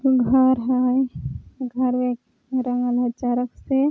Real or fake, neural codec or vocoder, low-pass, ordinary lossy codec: real; none; none; none